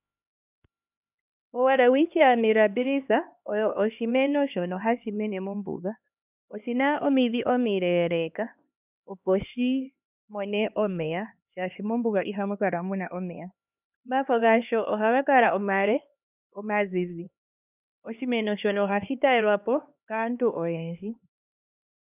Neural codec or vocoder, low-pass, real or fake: codec, 16 kHz, 2 kbps, X-Codec, HuBERT features, trained on LibriSpeech; 3.6 kHz; fake